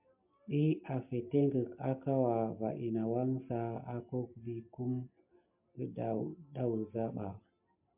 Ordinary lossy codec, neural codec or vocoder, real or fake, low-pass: MP3, 32 kbps; none; real; 3.6 kHz